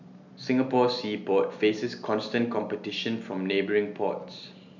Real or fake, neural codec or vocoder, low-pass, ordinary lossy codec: real; none; 7.2 kHz; none